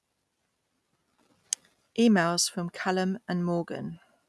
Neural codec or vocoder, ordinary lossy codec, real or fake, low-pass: none; none; real; none